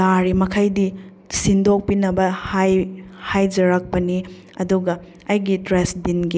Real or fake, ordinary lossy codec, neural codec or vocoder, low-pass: real; none; none; none